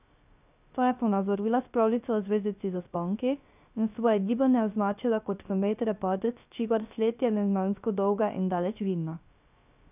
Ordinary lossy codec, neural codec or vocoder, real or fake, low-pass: none; codec, 16 kHz, 0.3 kbps, FocalCodec; fake; 3.6 kHz